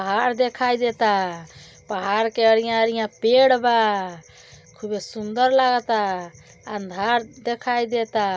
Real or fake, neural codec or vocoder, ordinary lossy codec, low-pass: real; none; none; none